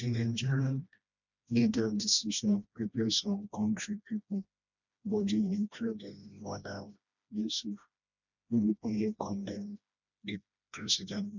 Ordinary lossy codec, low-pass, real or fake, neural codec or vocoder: none; 7.2 kHz; fake; codec, 16 kHz, 1 kbps, FreqCodec, smaller model